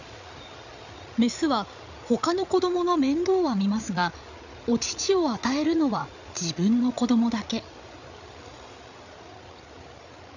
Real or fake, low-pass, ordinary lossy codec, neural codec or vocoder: fake; 7.2 kHz; none; codec, 16 kHz, 8 kbps, FreqCodec, larger model